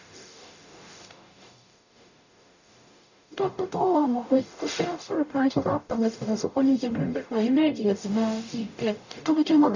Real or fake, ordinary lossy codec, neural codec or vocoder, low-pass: fake; none; codec, 44.1 kHz, 0.9 kbps, DAC; 7.2 kHz